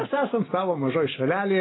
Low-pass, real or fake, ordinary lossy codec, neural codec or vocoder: 7.2 kHz; real; AAC, 16 kbps; none